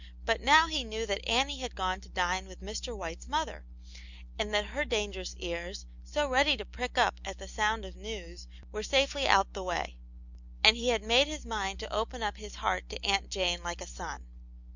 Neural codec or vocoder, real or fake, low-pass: none; real; 7.2 kHz